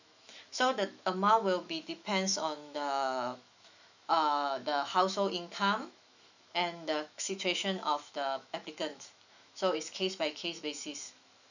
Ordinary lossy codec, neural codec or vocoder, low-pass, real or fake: none; autoencoder, 48 kHz, 128 numbers a frame, DAC-VAE, trained on Japanese speech; 7.2 kHz; fake